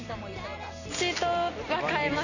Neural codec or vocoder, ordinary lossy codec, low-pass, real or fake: none; none; 7.2 kHz; real